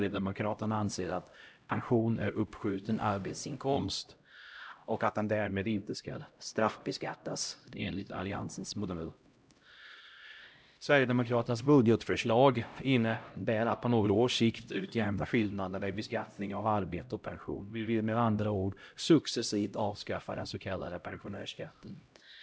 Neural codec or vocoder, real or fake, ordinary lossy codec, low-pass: codec, 16 kHz, 0.5 kbps, X-Codec, HuBERT features, trained on LibriSpeech; fake; none; none